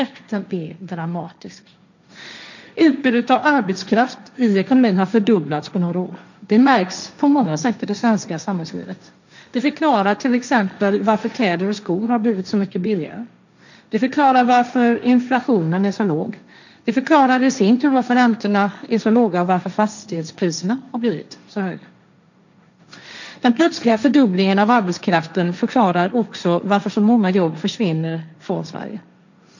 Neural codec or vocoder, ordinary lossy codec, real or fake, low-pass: codec, 16 kHz, 1.1 kbps, Voila-Tokenizer; none; fake; 7.2 kHz